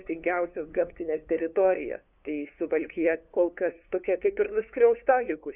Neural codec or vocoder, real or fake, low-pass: codec, 16 kHz, 2 kbps, FunCodec, trained on LibriTTS, 25 frames a second; fake; 3.6 kHz